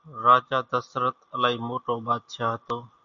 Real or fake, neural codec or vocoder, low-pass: real; none; 7.2 kHz